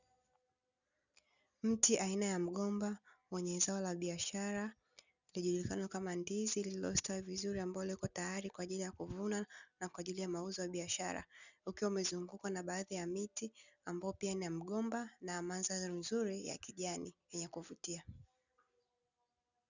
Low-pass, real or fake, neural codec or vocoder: 7.2 kHz; real; none